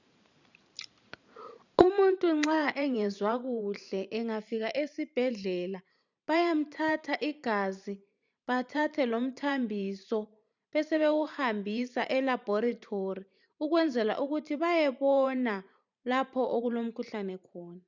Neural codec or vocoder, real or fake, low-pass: vocoder, 44.1 kHz, 128 mel bands every 512 samples, BigVGAN v2; fake; 7.2 kHz